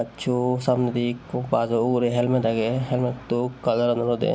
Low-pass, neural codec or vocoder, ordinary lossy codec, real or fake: none; none; none; real